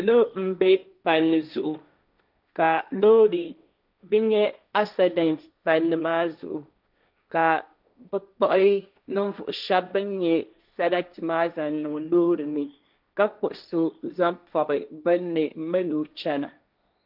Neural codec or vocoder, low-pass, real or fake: codec, 16 kHz, 1.1 kbps, Voila-Tokenizer; 5.4 kHz; fake